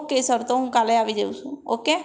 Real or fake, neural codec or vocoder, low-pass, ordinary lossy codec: real; none; none; none